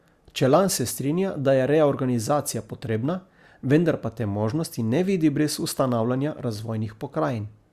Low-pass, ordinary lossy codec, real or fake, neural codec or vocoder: 14.4 kHz; Opus, 64 kbps; real; none